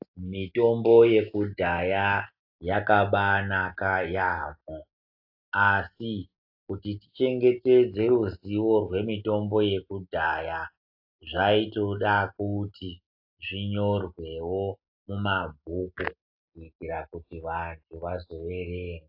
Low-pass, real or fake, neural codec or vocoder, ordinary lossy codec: 5.4 kHz; real; none; AAC, 48 kbps